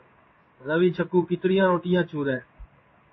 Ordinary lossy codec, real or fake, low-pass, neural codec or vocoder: MP3, 24 kbps; fake; 7.2 kHz; codec, 16 kHz in and 24 kHz out, 1 kbps, XY-Tokenizer